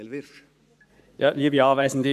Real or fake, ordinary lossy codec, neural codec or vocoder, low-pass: fake; AAC, 96 kbps; codec, 44.1 kHz, 7.8 kbps, Pupu-Codec; 14.4 kHz